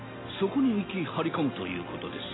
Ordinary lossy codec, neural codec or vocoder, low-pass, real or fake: AAC, 16 kbps; none; 7.2 kHz; real